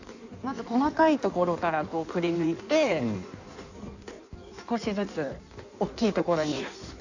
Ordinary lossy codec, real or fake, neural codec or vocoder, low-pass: none; fake; codec, 16 kHz in and 24 kHz out, 1.1 kbps, FireRedTTS-2 codec; 7.2 kHz